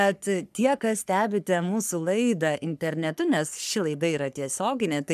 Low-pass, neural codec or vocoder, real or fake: 14.4 kHz; codec, 44.1 kHz, 3.4 kbps, Pupu-Codec; fake